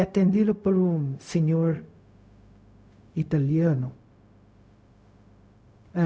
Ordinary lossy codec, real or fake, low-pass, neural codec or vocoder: none; fake; none; codec, 16 kHz, 0.4 kbps, LongCat-Audio-Codec